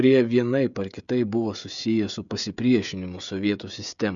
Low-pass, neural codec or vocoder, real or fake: 7.2 kHz; codec, 16 kHz, 16 kbps, FreqCodec, smaller model; fake